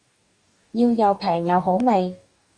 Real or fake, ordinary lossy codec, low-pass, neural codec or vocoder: fake; Opus, 64 kbps; 9.9 kHz; codec, 44.1 kHz, 2.6 kbps, DAC